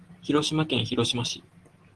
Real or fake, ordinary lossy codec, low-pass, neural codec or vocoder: real; Opus, 16 kbps; 10.8 kHz; none